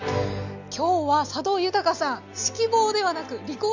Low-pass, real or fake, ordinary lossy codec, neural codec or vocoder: 7.2 kHz; real; none; none